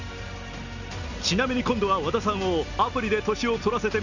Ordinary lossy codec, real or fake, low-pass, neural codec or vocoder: none; real; 7.2 kHz; none